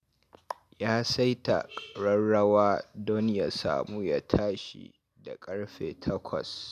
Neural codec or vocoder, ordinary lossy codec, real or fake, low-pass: none; none; real; 14.4 kHz